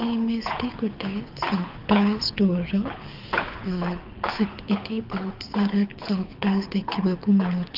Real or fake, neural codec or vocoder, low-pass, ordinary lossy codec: fake; codec, 24 kHz, 6 kbps, HILCodec; 5.4 kHz; Opus, 24 kbps